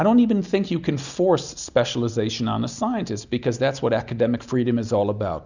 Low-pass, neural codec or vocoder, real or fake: 7.2 kHz; none; real